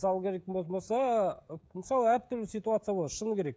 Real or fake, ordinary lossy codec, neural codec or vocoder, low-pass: fake; none; codec, 16 kHz, 16 kbps, FreqCodec, smaller model; none